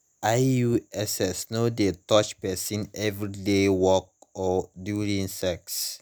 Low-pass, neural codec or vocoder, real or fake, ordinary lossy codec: none; none; real; none